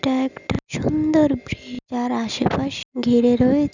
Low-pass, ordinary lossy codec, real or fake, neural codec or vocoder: 7.2 kHz; none; real; none